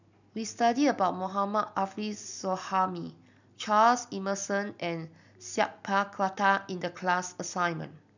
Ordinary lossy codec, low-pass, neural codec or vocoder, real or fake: none; 7.2 kHz; none; real